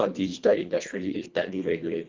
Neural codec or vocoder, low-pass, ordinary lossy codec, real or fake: codec, 24 kHz, 1.5 kbps, HILCodec; 7.2 kHz; Opus, 24 kbps; fake